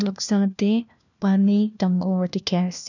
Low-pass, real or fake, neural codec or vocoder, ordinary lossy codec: 7.2 kHz; fake; codec, 16 kHz, 1 kbps, FunCodec, trained on LibriTTS, 50 frames a second; none